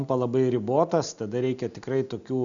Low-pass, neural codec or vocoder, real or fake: 7.2 kHz; none; real